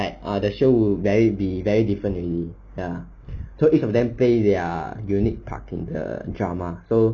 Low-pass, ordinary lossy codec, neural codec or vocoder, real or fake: 7.2 kHz; none; none; real